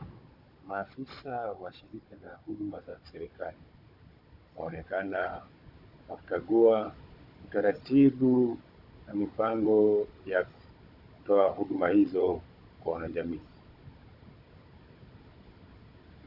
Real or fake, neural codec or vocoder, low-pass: fake; codec, 16 kHz, 16 kbps, FunCodec, trained on Chinese and English, 50 frames a second; 5.4 kHz